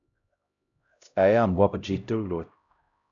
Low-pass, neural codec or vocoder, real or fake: 7.2 kHz; codec, 16 kHz, 0.5 kbps, X-Codec, HuBERT features, trained on LibriSpeech; fake